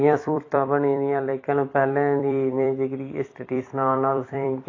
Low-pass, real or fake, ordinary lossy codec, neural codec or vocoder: 7.2 kHz; fake; AAC, 32 kbps; vocoder, 44.1 kHz, 128 mel bands every 256 samples, BigVGAN v2